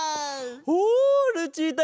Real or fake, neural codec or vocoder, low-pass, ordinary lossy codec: real; none; none; none